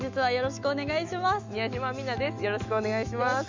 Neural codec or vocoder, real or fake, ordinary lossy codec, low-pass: autoencoder, 48 kHz, 128 numbers a frame, DAC-VAE, trained on Japanese speech; fake; MP3, 64 kbps; 7.2 kHz